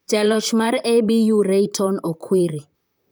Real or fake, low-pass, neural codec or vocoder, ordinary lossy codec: fake; none; vocoder, 44.1 kHz, 128 mel bands, Pupu-Vocoder; none